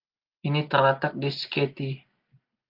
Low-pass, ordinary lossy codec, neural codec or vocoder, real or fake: 5.4 kHz; Opus, 32 kbps; none; real